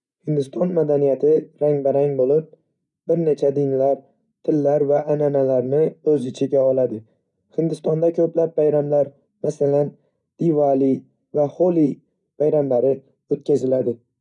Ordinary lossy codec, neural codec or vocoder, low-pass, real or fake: none; none; 10.8 kHz; real